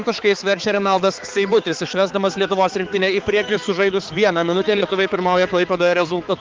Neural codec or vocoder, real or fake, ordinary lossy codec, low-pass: codec, 16 kHz, 4 kbps, X-Codec, HuBERT features, trained on balanced general audio; fake; Opus, 16 kbps; 7.2 kHz